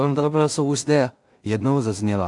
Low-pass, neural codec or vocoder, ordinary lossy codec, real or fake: 10.8 kHz; codec, 16 kHz in and 24 kHz out, 0.4 kbps, LongCat-Audio-Codec, two codebook decoder; AAC, 64 kbps; fake